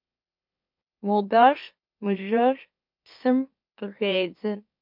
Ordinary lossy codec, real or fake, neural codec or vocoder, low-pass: MP3, 48 kbps; fake; autoencoder, 44.1 kHz, a latent of 192 numbers a frame, MeloTTS; 5.4 kHz